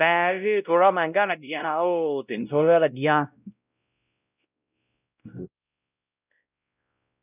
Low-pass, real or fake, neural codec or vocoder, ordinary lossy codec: 3.6 kHz; fake; codec, 16 kHz, 0.5 kbps, X-Codec, WavLM features, trained on Multilingual LibriSpeech; none